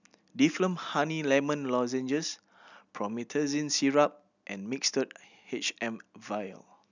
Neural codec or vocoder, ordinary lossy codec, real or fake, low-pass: none; none; real; 7.2 kHz